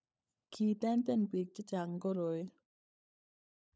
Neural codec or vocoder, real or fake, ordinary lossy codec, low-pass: codec, 16 kHz, 16 kbps, FunCodec, trained on LibriTTS, 50 frames a second; fake; none; none